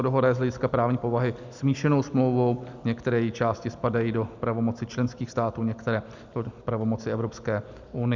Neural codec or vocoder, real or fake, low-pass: none; real; 7.2 kHz